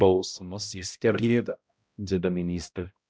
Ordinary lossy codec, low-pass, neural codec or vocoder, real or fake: none; none; codec, 16 kHz, 0.5 kbps, X-Codec, HuBERT features, trained on balanced general audio; fake